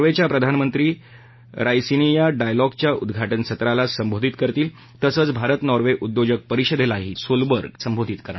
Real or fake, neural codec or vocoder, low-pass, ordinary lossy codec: real; none; 7.2 kHz; MP3, 24 kbps